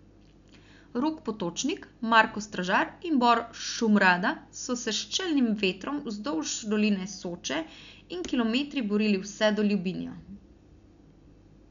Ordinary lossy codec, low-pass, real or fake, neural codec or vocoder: none; 7.2 kHz; real; none